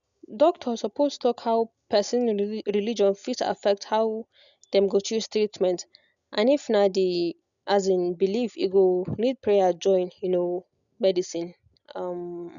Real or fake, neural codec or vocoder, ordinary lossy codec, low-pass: real; none; none; 7.2 kHz